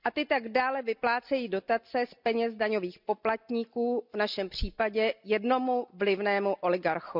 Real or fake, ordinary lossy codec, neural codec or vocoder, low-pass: real; none; none; 5.4 kHz